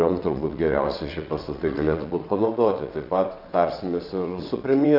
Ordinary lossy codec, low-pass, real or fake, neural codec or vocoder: AAC, 48 kbps; 5.4 kHz; fake; vocoder, 22.05 kHz, 80 mel bands, WaveNeXt